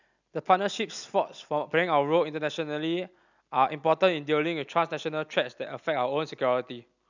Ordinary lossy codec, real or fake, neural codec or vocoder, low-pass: none; real; none; 7.2 kHz